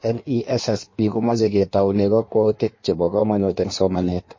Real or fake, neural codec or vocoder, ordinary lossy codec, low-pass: fake; codec, 16 kHz in and 24 kHz out, 1.1 kbps, FireRedTTS-2 codec; MP3, 32 kbps; 7.2 kHz